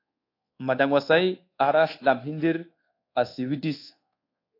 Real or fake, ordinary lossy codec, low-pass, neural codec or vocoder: fake; AAC, 32 kbps; 5.4 kHz; codec, 24 kHz, 1.2 kbps, DualCodec